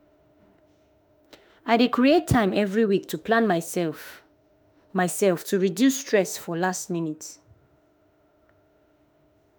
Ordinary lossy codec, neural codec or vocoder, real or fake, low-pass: none; autoencoder, 48 kHz, 32 numbers a frame, DAC-VAE, trained on Japanese speech; fake; none